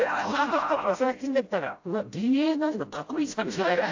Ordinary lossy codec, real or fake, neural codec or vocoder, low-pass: AAC, 48 kbps; fake; codec, 16 kHz, 0.5 kbps, FreqCodec, smaller model; 7.2 kHz